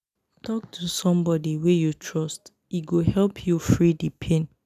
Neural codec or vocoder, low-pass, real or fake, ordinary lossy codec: none; 14.4 kHz; real; none